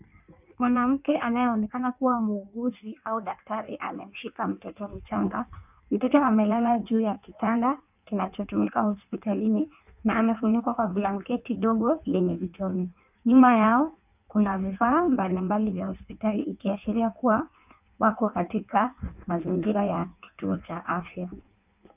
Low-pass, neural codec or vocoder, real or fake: 3.6 kHz; codec, 16 kHz in and 24 kHz out, 1.1 kbps, FireRedTTS-2 codec; fake